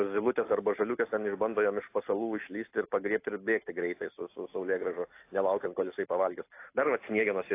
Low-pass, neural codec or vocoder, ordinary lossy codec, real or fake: 3.6 kHz; none; AAC, 24 kbps; real